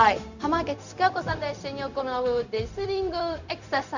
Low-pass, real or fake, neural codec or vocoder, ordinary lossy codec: 7.2 kHz; fake; codec, 16 kHz, 0.4 kbps, LongCat-Audio-Codec; none